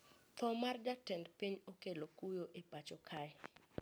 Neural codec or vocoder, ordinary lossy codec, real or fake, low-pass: none; none; real; none